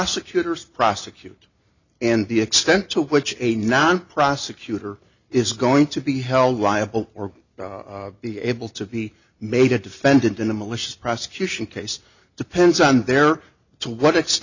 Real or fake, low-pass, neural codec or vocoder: real; 7.2 kHz; none